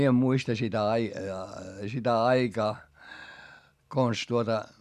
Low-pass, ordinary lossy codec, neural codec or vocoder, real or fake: 14.4 kHz; none; none; real